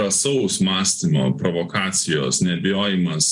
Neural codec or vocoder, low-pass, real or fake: none; 10.8 kHz; real